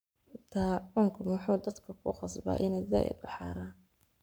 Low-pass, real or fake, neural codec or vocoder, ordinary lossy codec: none; fake; codec, 44.1 kHz, 7.8 kbps, Pupu-Codec; none